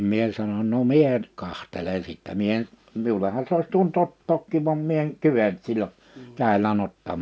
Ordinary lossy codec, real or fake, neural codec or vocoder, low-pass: none; real; none; none